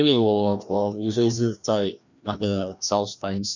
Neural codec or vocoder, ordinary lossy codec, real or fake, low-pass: codec, 16 kHz, 1 kbps, FreqCodec, larger model; none; fake; 7.2 kHz